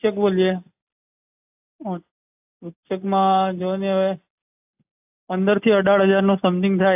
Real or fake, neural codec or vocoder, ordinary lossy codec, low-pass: real; none; AAC, 32 kbps; 3.6 kHz